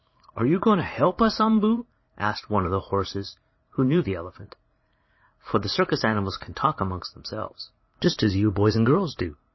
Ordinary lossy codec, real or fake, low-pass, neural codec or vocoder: MP3, 24 kbps; fake; 7.2 kHz; vocoder, 44.1 kHz, 80 mel bands, Vocos